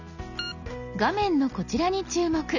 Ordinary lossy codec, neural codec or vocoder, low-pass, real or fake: none; none; 7.2 kHz; real